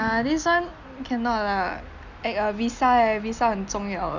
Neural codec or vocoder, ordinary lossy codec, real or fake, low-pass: none; none; real; 7.2 kHz